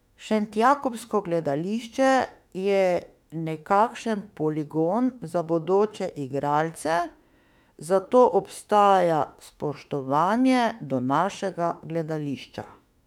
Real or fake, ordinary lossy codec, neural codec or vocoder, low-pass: fake; none; autoencoder, 48 kHz, 32 numbers a frame, DAC-VAE, trained on Japanese speech; 19.8 kHz